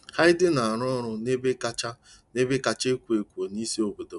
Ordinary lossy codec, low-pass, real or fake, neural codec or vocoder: none; 10.8 kHz; real; none